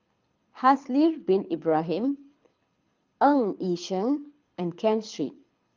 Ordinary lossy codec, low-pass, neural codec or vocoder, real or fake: Opus, 24 kbps; 7.2 kHz; codec, 24 kHz, 6 kbps, HILCodec; fake